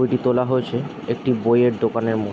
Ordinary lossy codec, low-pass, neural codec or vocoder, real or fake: none; none; none; real